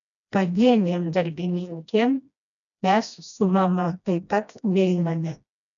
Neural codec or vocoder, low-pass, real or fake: codec, 16 kHz, 1 kbps, FreqCodec, smaller model; 7.2 kHz; fake